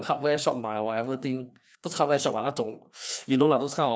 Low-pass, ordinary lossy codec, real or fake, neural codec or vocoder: none; none; fake; codec, 16 kHz, 2 kbps, FreqCodec, larger model